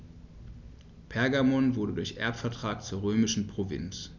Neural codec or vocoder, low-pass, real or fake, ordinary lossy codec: none; 7.2 kHz; real; none